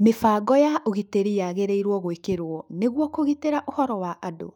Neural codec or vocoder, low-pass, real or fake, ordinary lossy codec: autoencoder, 48 kHz, 128 numbers a frame, DAC-VAE, trained on Japanese speech; 19.8 kHz; fake; none